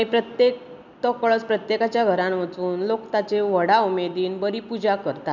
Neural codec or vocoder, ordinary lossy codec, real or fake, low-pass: none; none; real; 7.2 kHz